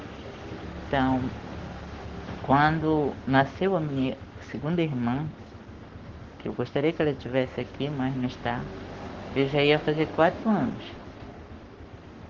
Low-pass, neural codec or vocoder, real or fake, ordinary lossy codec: 7.2 kHz; codec, 44.1 kHz, 7.8 kbps, Pupu-Codec; fake; Opus, 16 kbps